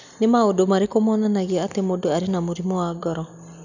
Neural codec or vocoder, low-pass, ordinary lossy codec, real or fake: none; 7.2 kHz; none; real